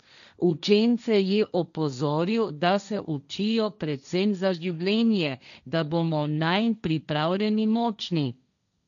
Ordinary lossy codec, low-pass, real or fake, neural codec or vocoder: none; 7.2 kHz; fake; codec, 16 kHz, 1.1 kbps, Voila-Tokenizer